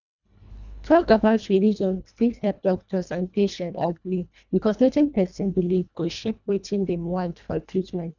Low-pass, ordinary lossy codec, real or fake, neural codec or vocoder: 7.2 kHz; none; fake; codec, 24 kHz, 1.5 kbps, HILCodec